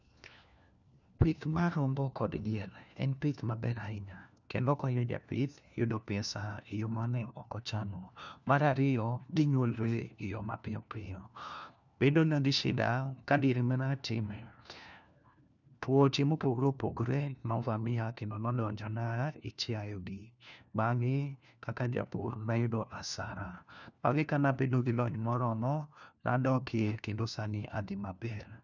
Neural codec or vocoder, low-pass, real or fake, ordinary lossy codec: codec, 16 kHz, 1 kbps, FunCodec, trained on LibriTTS, 50 frames a second; 7.2 kHz; fake; none